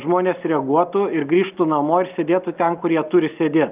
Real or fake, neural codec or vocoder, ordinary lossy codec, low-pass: real; none; Opus, 24 kbps; 3.6 kHz